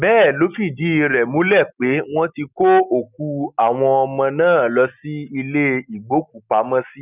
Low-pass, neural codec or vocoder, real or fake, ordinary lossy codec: 3.6 kHz; none; real; none